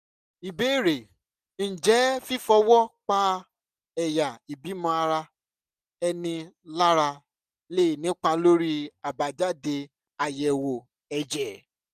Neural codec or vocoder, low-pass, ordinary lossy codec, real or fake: none; 14.4 kHz; none; real